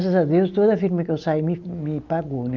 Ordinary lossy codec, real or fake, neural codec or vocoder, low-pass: Opus, 24 kbps; real; none; 7.2 kHz